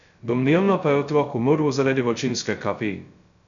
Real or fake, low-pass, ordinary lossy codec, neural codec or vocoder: fake; 7.2 kHz; none; codec, 16 kHz, 0.2 kbps, FocalCodec